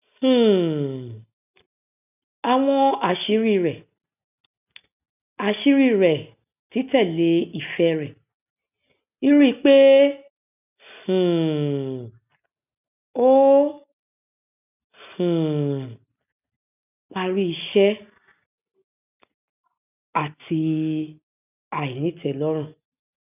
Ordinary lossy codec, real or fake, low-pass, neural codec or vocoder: none; real; 3.6 kHz; none